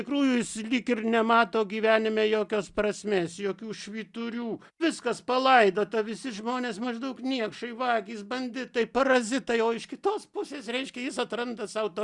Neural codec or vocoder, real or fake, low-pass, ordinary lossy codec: none; real; 10.8 kHz; Opus, 64 kbps